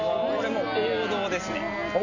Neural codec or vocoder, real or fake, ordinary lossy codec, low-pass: none; real; none; 7.2 kHz